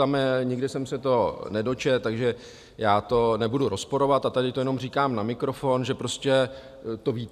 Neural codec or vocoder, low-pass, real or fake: none; 14.4 kHz; real